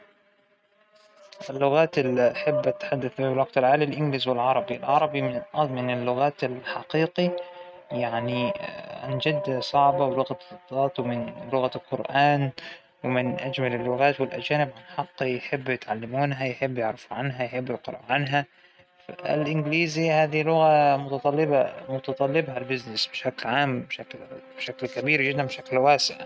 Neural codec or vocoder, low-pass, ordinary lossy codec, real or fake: none; none; none; real